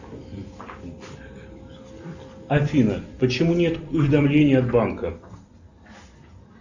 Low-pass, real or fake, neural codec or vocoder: 7.2 kHz; real; none